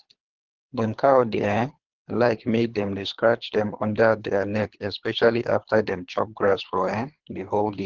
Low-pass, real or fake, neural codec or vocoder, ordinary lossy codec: 7.2 kHz; fake; codec, 24 kHz, 3 kbps, HILCodec; Opus, 16 kbps